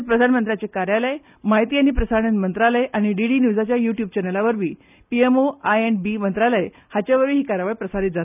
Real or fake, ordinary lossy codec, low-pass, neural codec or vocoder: real; none; 3.6 kHz; none